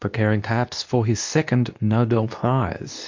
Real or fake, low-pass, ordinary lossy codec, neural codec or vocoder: fake; 7.2 kHz; MP3, 64 kbps; codec, 24 kHz, 0.9 kbps, WavTokenizer, medium speech release version 2